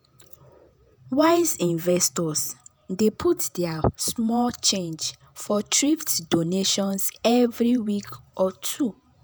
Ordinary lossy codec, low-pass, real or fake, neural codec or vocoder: none; none; fake; vocoder, 48 kHz, 128 mel bands, Vocos